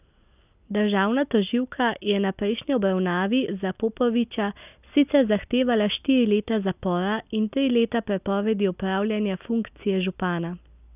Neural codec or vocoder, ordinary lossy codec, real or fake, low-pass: none; none; real; 3.6 kHz